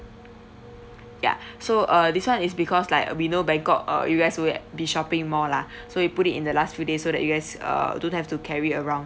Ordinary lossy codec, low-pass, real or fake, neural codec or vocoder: none; none; real; none